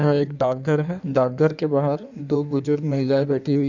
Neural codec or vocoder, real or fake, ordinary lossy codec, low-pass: codec, 16 kHz in and 24 kHz out, 1.1 kbps, FireRedTTS-2 codec; fake; none; 7.2 kHz